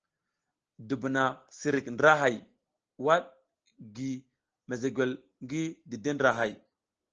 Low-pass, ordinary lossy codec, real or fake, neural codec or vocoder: 7.2 kHz; Opus, 16 kbps; real; none